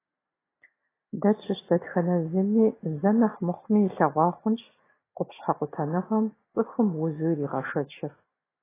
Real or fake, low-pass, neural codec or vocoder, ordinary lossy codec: real; 3.6 kHz; none; AAC, 16 kbps